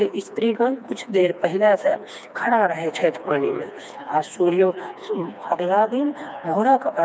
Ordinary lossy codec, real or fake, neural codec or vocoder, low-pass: none; fake; codec, 16 kHz, 2 kbps, FreqCodec, smaller model; none